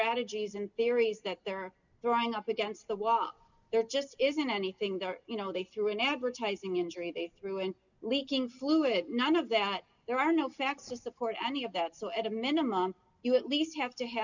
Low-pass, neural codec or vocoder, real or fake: 7.2 kHz; none; real